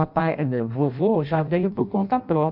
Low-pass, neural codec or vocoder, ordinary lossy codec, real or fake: 5.4 kHz; codec, 16 kHz in and 24 kHz out, 0.6 kbps, FireRedTTS-2 codec; MP3, 48 kbps; fake